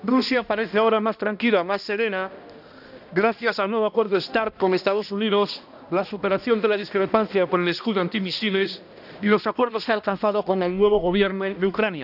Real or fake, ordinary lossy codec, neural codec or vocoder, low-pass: fake; none; codec, 16 kHz, 1 kbps, X-Codec, HuBERT features, trained on balanced general audio; 5.4 kHz